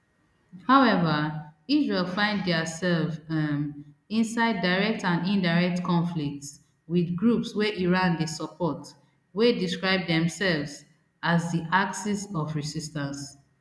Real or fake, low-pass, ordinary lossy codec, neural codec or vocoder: real; none; none; none